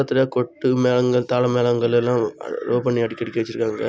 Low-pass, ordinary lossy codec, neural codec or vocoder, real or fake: none; none; none; real